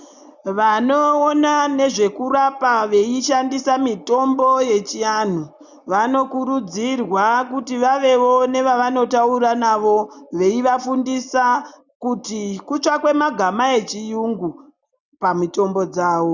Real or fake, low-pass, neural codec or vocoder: real; 7.2 kHz; none